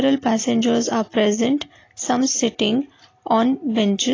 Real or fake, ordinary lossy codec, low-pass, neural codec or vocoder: real; AAC, 32 kbps; 7.2 kHz; none